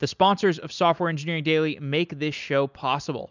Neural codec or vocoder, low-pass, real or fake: none; 7.2 kHz; real